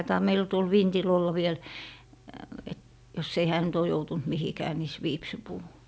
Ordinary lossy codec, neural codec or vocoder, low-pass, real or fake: none; none; none; real